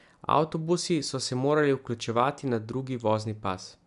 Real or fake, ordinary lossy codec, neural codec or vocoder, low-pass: real; none; none; 10.8 kHz